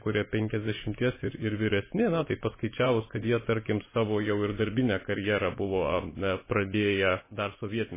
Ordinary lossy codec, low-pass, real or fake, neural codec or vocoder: MP3, 16 kbps; 3.6 kHz; fake; vocoder, 24 kHz, 100 mel bands, Vocos